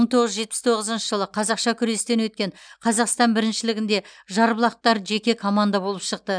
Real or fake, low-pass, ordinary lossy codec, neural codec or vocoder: real; 9.9 kHz; none; none